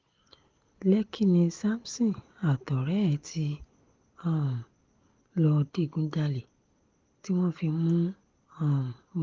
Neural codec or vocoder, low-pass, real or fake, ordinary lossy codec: none; 7.2 kHz; real; Opus, 16 kbps